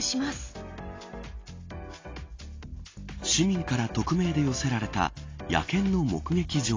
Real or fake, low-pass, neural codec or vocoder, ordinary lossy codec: real; 7.2 kHz; none; AAC, 32 kbps